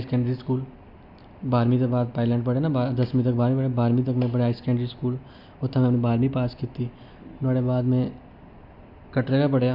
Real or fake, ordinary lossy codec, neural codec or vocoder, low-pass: real; MP3, 48 kbps; none; 5.4 kHz